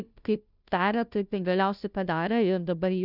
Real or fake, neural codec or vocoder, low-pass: fake; codec, 16 kHz, 0.5 kbps, FunCodec, trained on Chinese and English, 25 frames a second; 5.4 kHz